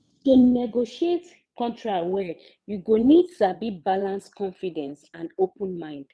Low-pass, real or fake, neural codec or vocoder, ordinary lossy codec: none; fake; vocoder, 22.05 kHz, 80 mel bands, WaveNeXt; none